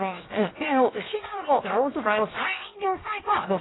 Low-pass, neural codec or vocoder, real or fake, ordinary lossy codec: 7.2 kHz; codec, 16 kHz, 0.7 kbps, FocalCodec; fake; AAC, 16 kbps